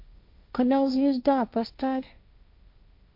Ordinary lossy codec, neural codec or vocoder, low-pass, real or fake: MP3, 48 kbps; codec, 16 kHz, 1.1 kbps, Voila-Tokenizer; 5.4 kHz; fake